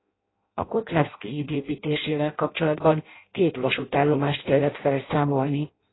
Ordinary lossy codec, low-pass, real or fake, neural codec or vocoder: AAC, 16 kbps; 7.2 kHz; fake; codec, 16 kHz in and 24 kHz out, 0.6 kbps, FireRedTTS-2 codec